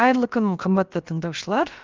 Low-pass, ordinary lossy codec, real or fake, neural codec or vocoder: 7.2 kHz; Opus, 24 kbps; fake; codec, 16 kHz, about 1 kbps, DyCAST, with the encoder's durations